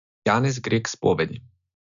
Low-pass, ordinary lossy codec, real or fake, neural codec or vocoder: 7.2 kHz; none; real; none